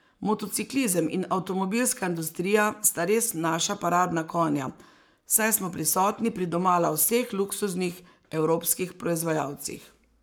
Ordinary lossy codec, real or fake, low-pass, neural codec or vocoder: none; fake; none; codec, 44.1 kHz, 7.8 kbps, Pupu-Codec